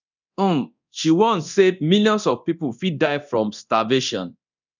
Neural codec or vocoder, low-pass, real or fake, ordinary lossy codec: codec, 24 kHz, 0.9 kbps, DualCodec; 7.2 kHz; fake; none